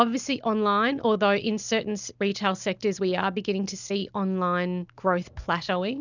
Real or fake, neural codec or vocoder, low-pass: real; none; 7.2 kHz